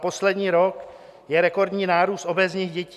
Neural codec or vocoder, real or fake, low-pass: none; real; 14.4 kHz